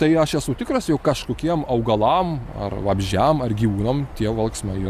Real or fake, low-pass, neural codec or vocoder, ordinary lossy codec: real; 14.4 kHz; none; Opus, 64 kbps